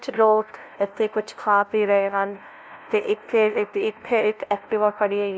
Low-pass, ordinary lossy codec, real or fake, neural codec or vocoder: none; none; fake; codec, 16 kHz, 0.5 kbps, FunCodec, trained on LibriTTS, 25 frames a second